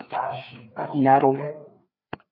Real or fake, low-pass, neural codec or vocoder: fake; 5.4 kHz; codec, 16 kHz, 4 kbps, FreqCodec, larger model